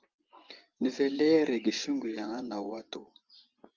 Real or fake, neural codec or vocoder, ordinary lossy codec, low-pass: fake; vocoder, 24 kHz, 100 mel bands, Vocos; Opus, 24 kbps; 7.2 kHz